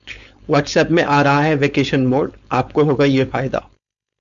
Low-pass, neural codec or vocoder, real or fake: 7.2 kHz; codec, 16 kHz, 4.8 kbps, FACodec; fake